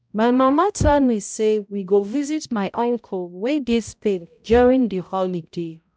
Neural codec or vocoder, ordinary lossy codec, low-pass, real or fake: codec, 16 kHz, 0.5 kbps, X-Codec, HuBERT features, trained on balanced general audio; none; none; fake